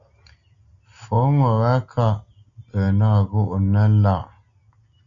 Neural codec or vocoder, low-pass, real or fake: none; 7.2 kHz; real